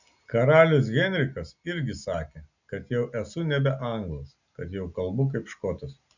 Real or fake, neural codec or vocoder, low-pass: real; none; 7.2 kHz